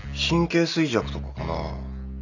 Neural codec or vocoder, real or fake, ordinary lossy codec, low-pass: none; real; none; 7.2 kHz